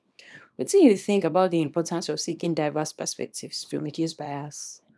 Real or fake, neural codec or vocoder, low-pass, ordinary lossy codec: fake; codec, 24 kHz, 0.9 kbps, WavTokenizer, small release; none; none